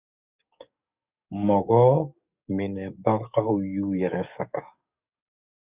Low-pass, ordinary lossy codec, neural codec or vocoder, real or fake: 3.6 kHz; Opus, 64 kbps; codec, 44.1 kHz, 7.8 kbps, DAC; fake